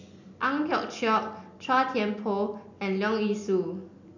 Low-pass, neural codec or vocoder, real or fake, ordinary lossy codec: 7.2 kHz; none; real; none